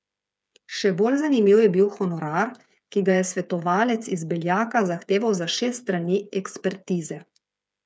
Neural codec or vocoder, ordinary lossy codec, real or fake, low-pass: codec, 16 kHz, 8 kbps, FreqCodec, smaller model; none; fake; none